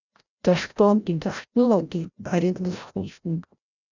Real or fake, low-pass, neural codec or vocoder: fake; 7.2 kHz; codec, 16 kHz, 0.5 kbps, FreqCodec, larger model